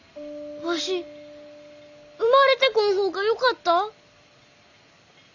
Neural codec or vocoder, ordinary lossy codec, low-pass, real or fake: none; none; 7.2 kHz; real